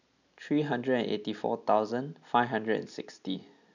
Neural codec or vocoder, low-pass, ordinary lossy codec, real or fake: none; 7.2 kHz; none; real